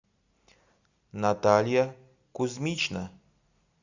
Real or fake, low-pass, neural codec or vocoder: real; 7.2 kHz; none